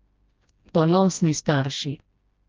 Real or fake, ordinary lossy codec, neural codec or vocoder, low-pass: fake; Opus, 24 kbps; codec, 16 kHz, 1 kbps, FreqCodec, smaller model; 7.2 kHz